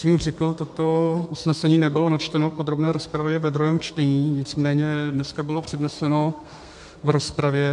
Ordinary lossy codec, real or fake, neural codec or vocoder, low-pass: MP3, 64 kbps; fake; codec, 32 kHz, 1.9 kbps, SNAC; 10.8 kHz